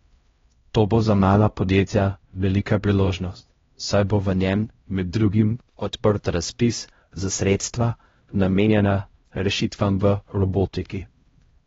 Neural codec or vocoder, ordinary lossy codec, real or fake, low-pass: codec, 16 kHz, 0.5 kbps, X-Codec, HuBERT features, trained on LibriSpeech; AAC, 24 kbps; fake; 7.2 kHz